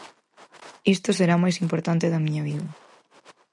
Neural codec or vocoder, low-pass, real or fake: none; 10.8 kHz; real